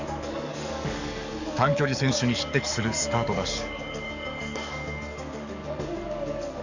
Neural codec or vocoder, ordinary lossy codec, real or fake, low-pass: codec, 44.1 kHz, 7.8 kbps, DAC; none; fake; 7.2 kHz